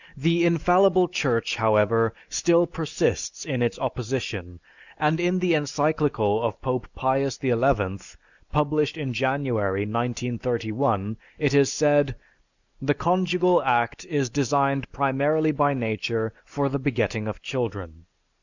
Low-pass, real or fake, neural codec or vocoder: 7.2 kHz; real; none